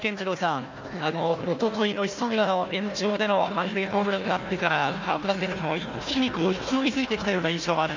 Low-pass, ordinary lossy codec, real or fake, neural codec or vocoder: 7.2 kHz; MP3, 64 kbps; fake; codec, 16 kHz, 1 kbps, FunCodec, trained on Chinese and English, 50 frames a second